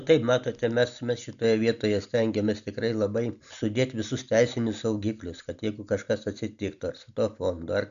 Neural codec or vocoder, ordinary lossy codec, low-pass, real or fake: none; MP3, 96 kbps; 7.2 kHz; real